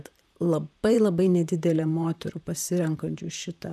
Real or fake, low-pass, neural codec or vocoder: fake; 14.4 kHz; vocoder, 44.1 kHz, 128 mel bands, Pupu-Vocoder